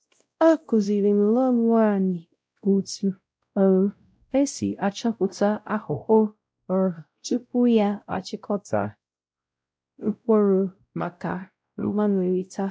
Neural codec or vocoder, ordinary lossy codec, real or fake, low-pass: codec, 16 kHz, 0.5 kbps, X-Codec, WavLM features, trained on Multilingual LibriSpeech; none; fake; none